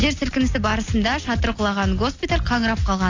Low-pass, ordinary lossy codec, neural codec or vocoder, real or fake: 7.2 kHz; AAC, 48 kbps; none; real